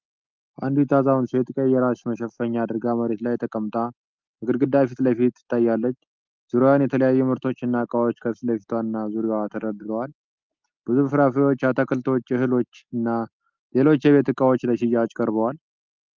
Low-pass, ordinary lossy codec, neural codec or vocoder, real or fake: 7.2 kHz; Opus, 32 kbps; none; real